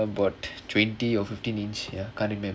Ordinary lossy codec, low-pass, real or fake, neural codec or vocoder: none; none; real; none